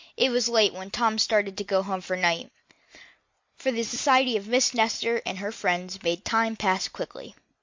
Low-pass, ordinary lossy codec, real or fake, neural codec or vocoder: 7.2 kHz; MP3, 48 kbps; real; none